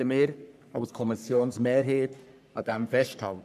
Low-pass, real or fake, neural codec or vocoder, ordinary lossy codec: 14.4 kHz; fake; codec, 44.1 kHz, 3.4 kbps, Pupu-Codec; none